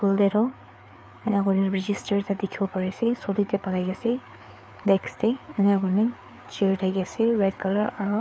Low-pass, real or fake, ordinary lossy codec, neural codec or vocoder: none; fake; none; codec, 16 kHz, 4 kbps, FreqCodec, larger model